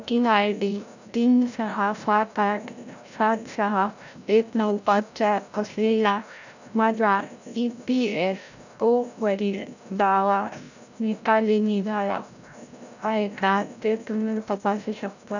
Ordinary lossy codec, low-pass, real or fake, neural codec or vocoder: none; 7.2 kHz; fake; codec, 16 kHz, 0.5 kbps, FreqCodec, larger model